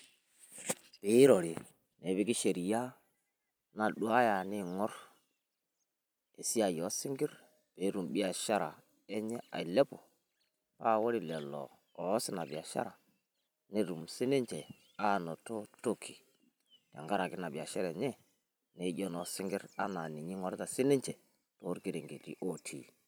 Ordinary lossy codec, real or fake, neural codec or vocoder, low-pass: none; fake; vocoder, 44.1 kHz, 128 mel bands every 256 samples, BigVGAN v2; none